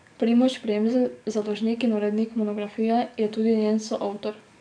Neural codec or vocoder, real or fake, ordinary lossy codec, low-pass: codec, 44.1 kHz, 7.8 kbps, DAC; fake; none; 9.9 kHz